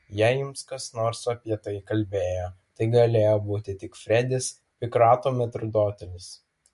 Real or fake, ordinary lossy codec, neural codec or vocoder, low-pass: real; MP3, 48 kbps; none; 14.4 kHz